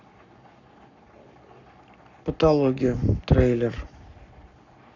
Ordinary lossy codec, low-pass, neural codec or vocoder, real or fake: none; 7.2 kHz; codec, 44.1 kHz, 7.8 kbps, Pupu-Codec; fake